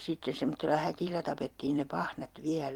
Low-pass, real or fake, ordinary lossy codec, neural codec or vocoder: 19.8 kHz; fake; Opus, 24 kbps; vocoder, 44.1 kHz, 128 mel bands every 256 samples, BigVGAN v2